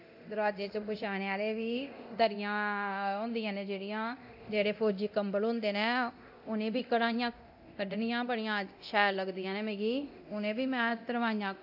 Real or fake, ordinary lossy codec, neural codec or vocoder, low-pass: fake; AAC, 48 kbps; codec, 24 kHz, 0.9 kbps, DualCodec; 5.4 kHz